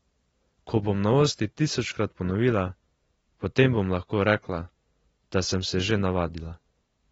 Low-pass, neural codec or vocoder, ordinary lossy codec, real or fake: 19.8 kHz; none; AAC, 24 kbps; real